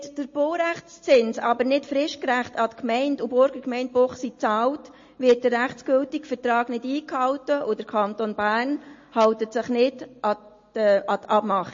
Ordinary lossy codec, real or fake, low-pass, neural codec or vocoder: MP3, 32 kbps; real; 7.2 kHz; none